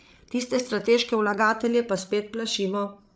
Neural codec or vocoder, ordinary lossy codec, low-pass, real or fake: codec, 16 kHz, 8 kbps, FreqCodec, larger model; none; none; fake